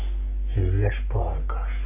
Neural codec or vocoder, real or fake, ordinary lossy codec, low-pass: codec, 44.1 kHz, 3.4 kbps, Pupu-Codec; fake; none; 3.6 kHz